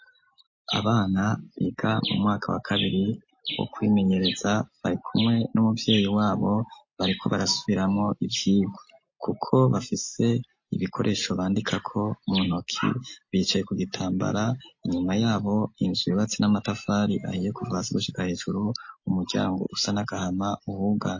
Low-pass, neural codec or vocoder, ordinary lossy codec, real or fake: 7.2 kHz; none; MP3, 32 kbps; real